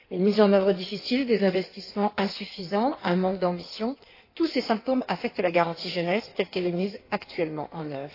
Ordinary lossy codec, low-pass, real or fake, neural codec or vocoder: AAC, 32 kbps; 5.4 kHz; fake; codec, 16 kHz in and 24 kHz out, 1.1 kbps, FireRedTTS-2 codec